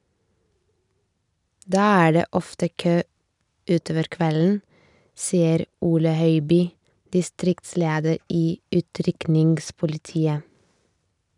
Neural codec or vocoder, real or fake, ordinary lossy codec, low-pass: none; real; none; 10.8 kHz